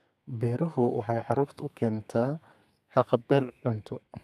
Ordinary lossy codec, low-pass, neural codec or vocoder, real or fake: none; 14.4 kHz; codec, 32 kHz, 1.9 kbps, SNAC; fake